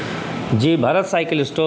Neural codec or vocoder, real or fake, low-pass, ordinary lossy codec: none; real; none; none